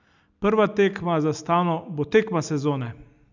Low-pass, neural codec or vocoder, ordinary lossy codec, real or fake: 7.2 kHz; none; none; real